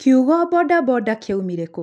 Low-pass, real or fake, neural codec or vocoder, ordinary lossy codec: none; real; none; none